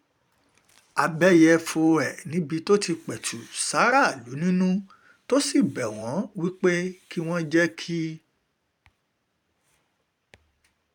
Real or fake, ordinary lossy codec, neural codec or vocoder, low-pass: fake; none; vocoder, 44.1 kHz, 128 mel bands every 512 samples, BigVGAN v2; 19.8 kHz